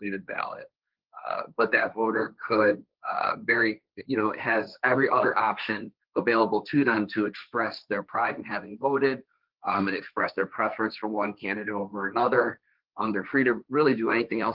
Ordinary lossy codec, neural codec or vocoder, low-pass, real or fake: Opus, 32 kbps; codec, 16 kHz, 1.1 kbps, Voila-Tokenizer; 5.4 kHz; fake